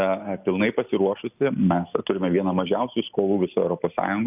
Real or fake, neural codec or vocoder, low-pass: real; none; 3.6 kHz